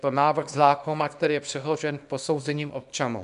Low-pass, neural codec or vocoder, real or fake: 10.8 kHz; codec, 24 kHz, 0.9 kbps, WavTokenizer, small release; fake